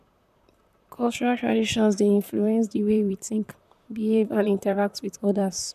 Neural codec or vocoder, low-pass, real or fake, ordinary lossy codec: vocoder, 44.1 kHz, 128 mel bands, Pupu-Vocoder; 14.4 kHz; fake; none